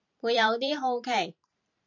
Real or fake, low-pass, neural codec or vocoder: fake; 7.2 kHz; vocoder, 44.1 kHz, 128 mel bands every 512 samples, BigVGAN v2